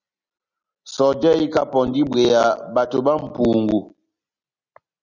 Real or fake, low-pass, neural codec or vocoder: real; 7.2 kHz; none